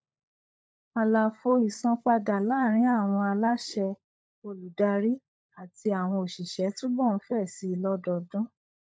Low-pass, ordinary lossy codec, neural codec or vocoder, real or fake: none; none; codec, 16 kHz, 16 kbps, FunCodec, trained on LibriTTS, 50 frames a second; fake